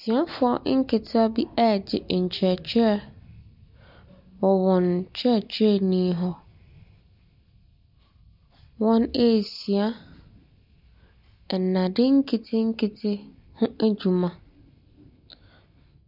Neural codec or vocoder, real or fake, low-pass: none; real; 5.4 kHz